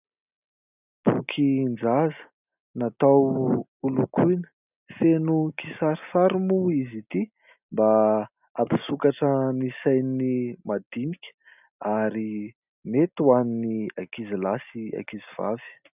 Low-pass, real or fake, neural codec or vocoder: 3.6 kHz; real; none